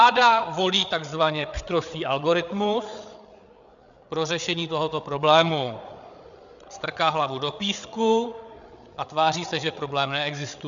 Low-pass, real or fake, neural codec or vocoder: 7.2 kHz; fake; codec, 16 kHz, 8 kbps, FreqCodec, larger model